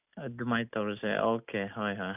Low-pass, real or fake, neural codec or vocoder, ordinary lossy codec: 3.6 kHz; real; none; none